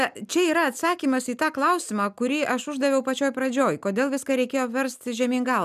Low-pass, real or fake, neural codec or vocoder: 14.4 kHz; real; none